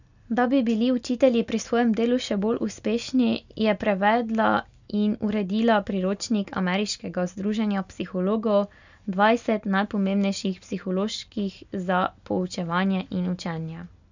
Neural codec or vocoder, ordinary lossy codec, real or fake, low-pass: none; none; real; 7.2 kHz